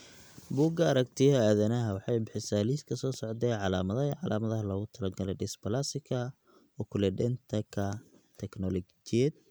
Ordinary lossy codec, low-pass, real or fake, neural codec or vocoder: none; none; real; none